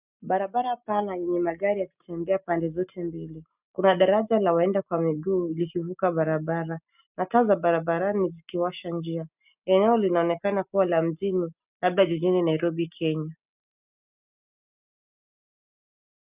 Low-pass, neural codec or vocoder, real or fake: 3.6 kHz; none; real